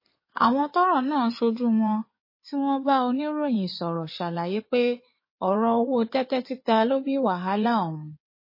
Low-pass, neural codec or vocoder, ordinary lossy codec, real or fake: 5.4 kHz; codec, 16 kHz in and 24 kHz out, 2.2 kbps, FireRedTTS-2 codec; MP3, 24 kbps; fake